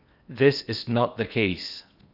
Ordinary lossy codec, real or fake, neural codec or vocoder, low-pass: none; fake; codec, 16 kHz in and 24 kHz out, 0.8 kbps, FocalCodec, streaming, 65536 codes; 5.4 kHz